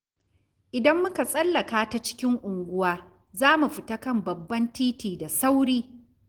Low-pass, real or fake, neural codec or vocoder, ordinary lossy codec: 19.8 kHz; real; none; Opus, 16 kbps